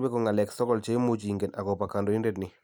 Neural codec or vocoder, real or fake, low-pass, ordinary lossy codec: none; real; none; none